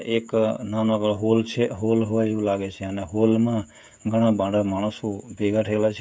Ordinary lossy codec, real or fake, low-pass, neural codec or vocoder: none; fake; none; codec, 16 kHz, 16 kbps, FreqCodec, smaller model